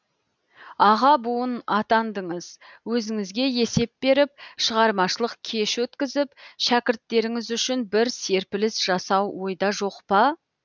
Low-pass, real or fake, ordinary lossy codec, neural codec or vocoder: 7.2 kHz; real; none; none